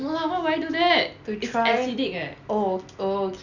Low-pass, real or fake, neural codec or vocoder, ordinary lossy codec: 7.2 kHz; real; none; none